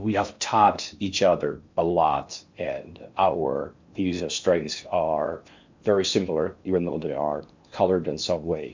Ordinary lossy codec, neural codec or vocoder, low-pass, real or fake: MP3, 64 kbps; codec, 16 kHz in and 24 kHz out, 0.6 kbps, FocalCodec, streaming, 4096 codes; 7.2 kHz; fake